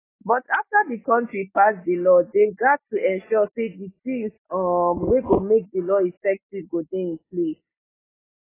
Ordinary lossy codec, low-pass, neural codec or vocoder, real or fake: AAC, 16 kbps; 3.6 kHz; none; real